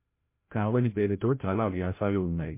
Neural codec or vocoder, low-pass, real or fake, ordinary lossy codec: codec, 16 kHz, 0.5 kbps, FreqCodec, larger model; 3.6 kHz; fake; MP3, 24 kbps